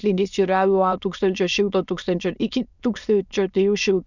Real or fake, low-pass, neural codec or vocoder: fake; 7.2 kHz; autoencoder, 22.05 kHz, a latent of 192 numbers a frame, VITS, trained on many speakers